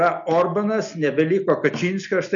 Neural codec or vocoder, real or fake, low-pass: none; real; 7.2 kHz